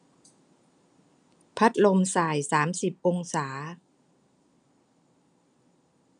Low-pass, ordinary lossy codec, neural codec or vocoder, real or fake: 9.9 kHz; none; none; real